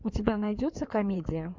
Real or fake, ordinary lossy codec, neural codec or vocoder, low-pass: fake; MP3, 64 kbps; codec, 16 kHz in and 24 kHz out, 2.2 kbps, FireRedTTS-2 codec; 7.2 kHz